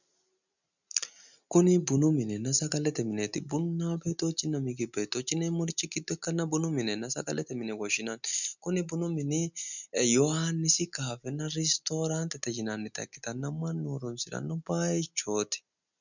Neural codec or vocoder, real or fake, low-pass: none; real; 7.2 kHz